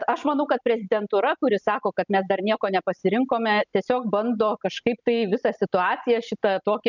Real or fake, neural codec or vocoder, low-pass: real; none; 7.2 kHz